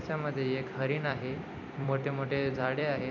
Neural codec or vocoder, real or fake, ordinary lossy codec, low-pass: vocoder, 44.1 kHz, 128 mel bands every 256 samples, BigVGAN v2; fake; none; 7.2 kHz